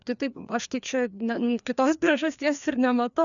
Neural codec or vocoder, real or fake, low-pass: codec, 16 kHz, 2 kbps, FreqCodec, larger model; fake; 7.2 kHz